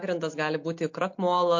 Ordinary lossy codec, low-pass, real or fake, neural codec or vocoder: MP3, 48 kbps; 7.2 kHz; real; none